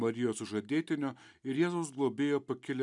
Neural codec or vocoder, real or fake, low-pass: none; real; 10.8 kHz